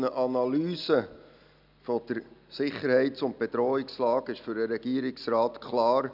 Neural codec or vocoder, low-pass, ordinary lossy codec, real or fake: none; 5.4 kHz; none; real